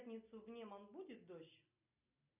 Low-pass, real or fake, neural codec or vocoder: 3.6 kHz; real; none